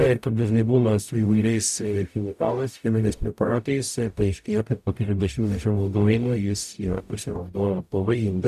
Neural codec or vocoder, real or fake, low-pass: codec, 44.1 kHz, 0.9 kbps, DAC; fake; 14.4 kHz